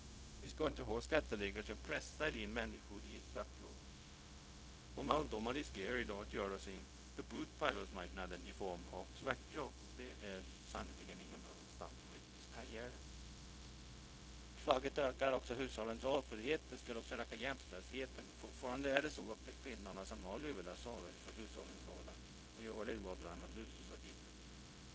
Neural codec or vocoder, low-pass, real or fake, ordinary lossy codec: codec, 16 kHz, 0.4 kbps, LongCat-Audio-Codec; none; fake; none